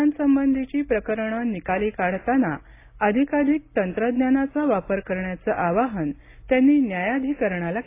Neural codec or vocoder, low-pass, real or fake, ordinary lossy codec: none; 3.6 kHz; real; AAC, 24 kbps